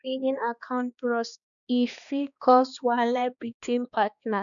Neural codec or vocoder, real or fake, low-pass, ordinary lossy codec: codec, 16 kHz, 2 kbps, X-Codec, HuBERT features, trained on balanced general audio; fake; 7.2 kHz; none